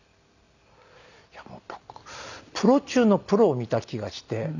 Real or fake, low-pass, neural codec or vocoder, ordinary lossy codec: real; 7.2 kHz; none; none